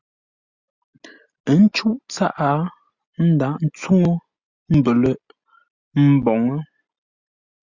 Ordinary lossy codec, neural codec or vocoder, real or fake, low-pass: Opus, 64 kbps; none; real; 7.2 kHz